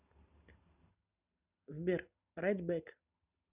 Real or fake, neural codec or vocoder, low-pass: real; none; 3.6 kHz